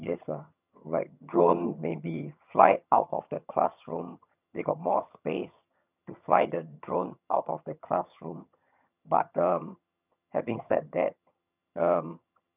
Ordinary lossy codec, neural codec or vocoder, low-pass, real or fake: none; vocoder, 22.05 kHz, 80 mel bands, HiFi-GAN; 3.6 kHz; fake